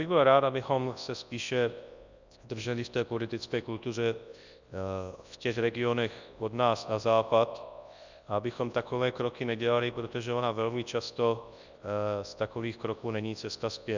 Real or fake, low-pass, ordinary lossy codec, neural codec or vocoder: fake; 7.2 kHz; Opus, 64 kbps; codec, 24 kHz, 0.9 kbps, WavTokenizer, large speech release